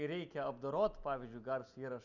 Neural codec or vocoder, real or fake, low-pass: none; real; 7.2 kHz